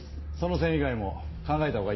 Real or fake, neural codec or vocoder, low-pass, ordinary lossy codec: real; none; 7.2 kHz; MP3, 24 kbps